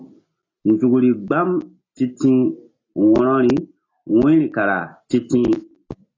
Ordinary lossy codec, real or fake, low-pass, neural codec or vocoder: AAC, 32 kbps; real; 7.2 kHz; none